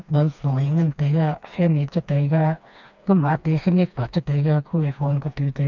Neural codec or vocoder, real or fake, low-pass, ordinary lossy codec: codec, 16 kHz, 2 kbps, FreqCodec, smaller model; fake; 7.2 kHz; none